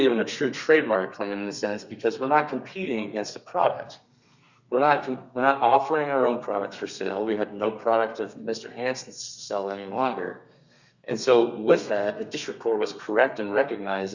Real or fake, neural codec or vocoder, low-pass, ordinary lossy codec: fake; codec, 32 kHz, 1.9 kbps, SNAC; 7.2 kHz; Opus, 64 kbps